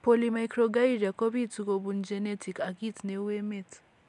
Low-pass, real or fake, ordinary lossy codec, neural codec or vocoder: 10.8 kHz; real; MP3, 96 kbps; none